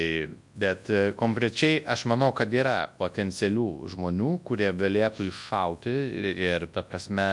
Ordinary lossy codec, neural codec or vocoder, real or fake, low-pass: MP3, 64 kbps; codec, 24 kHz, 0.9 kbps, WavTokenizer, large speech release; fake; 10.8 kHz